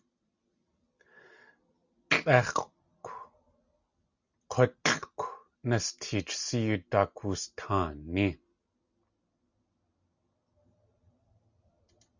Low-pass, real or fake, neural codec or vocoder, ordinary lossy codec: 7.2 kHz; real; none; Opus, 64 kbps